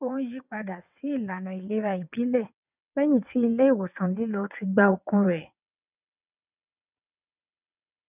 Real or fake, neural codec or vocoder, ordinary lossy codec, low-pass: fake; vocoder, 22.05 kHz, 80 mel bands, WaveNeXt; none; 3.6 kHz